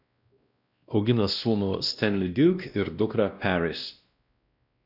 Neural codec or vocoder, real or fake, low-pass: codec, 16 kHz, 1 kbps, X-Codec, WavLM features, trained on Multilingual LibriSpeech; fake; 5.4 kHz